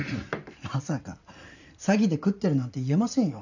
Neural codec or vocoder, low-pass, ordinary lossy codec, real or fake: vocoder, 44.1 kHz, 128 mel bands every 512 samples, BigVGAN v2; 7.2 kHz; none; fake